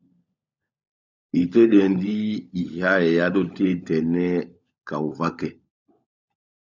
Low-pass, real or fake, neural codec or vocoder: 7.2 kHz; fake; codec, 16 kHz, 16 kbps, FunCodec, trained on LibriTTS, 50 frames a second